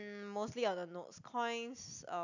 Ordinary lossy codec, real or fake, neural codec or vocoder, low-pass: none; real; none; 7.2 kHz